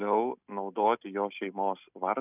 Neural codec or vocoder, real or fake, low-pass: none; real; 3.6 kHz